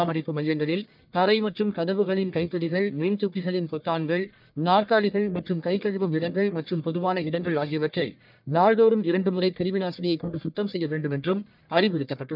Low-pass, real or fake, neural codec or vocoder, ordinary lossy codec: 5.4 kHz; fake; codec, 44.1 kHz, 1.7 kbps, Pupu-Codec; none